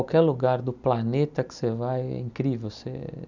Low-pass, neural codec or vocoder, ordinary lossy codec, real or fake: 7.2 kHz; none; none; real